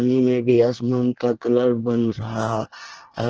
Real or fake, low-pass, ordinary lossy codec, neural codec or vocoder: fake; 7.2 kHz; Opus, 16 kbps; codec, 24 kHz, 1 kbps, SNAC